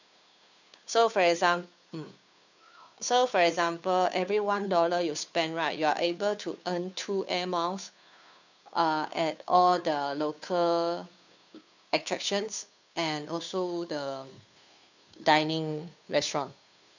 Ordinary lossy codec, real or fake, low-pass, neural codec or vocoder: none; fake; 7.2 kHz; codec, 16 kHz, 2 kbps, FunCodec, trained on Chinese and English, 25 frames a second